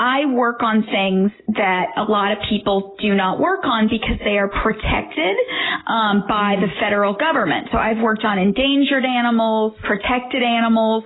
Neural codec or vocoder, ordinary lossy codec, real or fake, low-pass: none; AAC, 16 kbps; real; 7.2 kHz